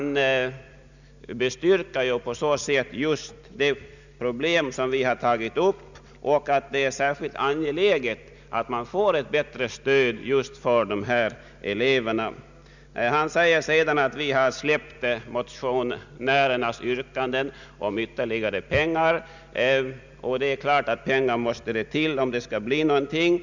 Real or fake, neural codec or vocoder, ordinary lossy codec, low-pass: real; none; none; 7.2 kHz